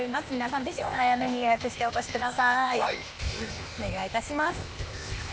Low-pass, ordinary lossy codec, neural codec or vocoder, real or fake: none; none; codec, 16 kHz, 0.8 kbps, ZipCodec; fake